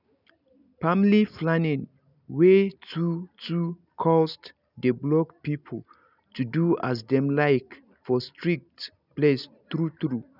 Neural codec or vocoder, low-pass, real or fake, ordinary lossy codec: none; 5.4 kHz; real; none